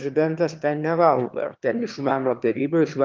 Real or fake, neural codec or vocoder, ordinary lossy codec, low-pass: fake; autoencoder, 22.05 kHz, a latent of 192 numbers a frame, VITS, trained on one speaker; Opus, 32 kbps; 7.2 kHz